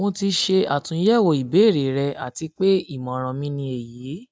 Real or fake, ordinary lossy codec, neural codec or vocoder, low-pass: real; none; none; none